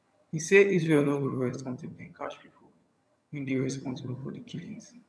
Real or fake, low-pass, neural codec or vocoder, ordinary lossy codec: fake; none; vocoder, 22.05 kHz, 80 mel bands, HiFi-GAN; none